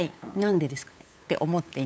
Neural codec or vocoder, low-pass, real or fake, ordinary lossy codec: codec, 16 kHz, 8 kbps, FunCodec, trained on LibriTTS, 25 frames a second; none; fake; none